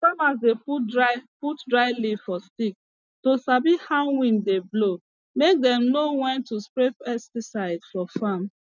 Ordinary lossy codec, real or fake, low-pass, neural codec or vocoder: none; real; 7.2 kHz; none